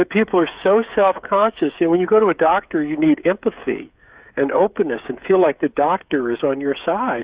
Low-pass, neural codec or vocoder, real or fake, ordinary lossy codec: 3.6 kHz; codec, 16 kHz, 8 kbps, FreqCodec, smaller model; fake; Opus, 64 kbps